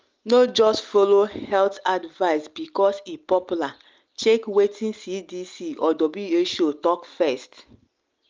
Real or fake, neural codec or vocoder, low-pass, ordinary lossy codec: real; none; 7.2 kHz; Opus, 24 kbps